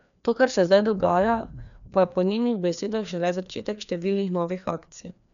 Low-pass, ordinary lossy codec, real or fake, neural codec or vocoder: 7.2 kHz; none; fake; codec, 16 kHz, 2 kbps, FreqCodec, larger model